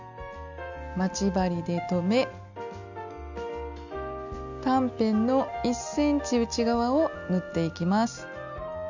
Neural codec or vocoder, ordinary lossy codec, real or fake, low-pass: none; none; real; 7.2 kHz